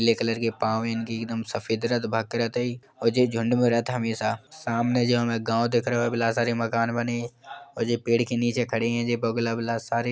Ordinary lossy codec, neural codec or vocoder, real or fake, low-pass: none; none; real; none